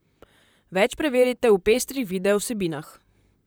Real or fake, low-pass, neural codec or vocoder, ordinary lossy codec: fake; none; vocoder, 44.1 kHz, 128 mel bands, Pupu-Vocoder; none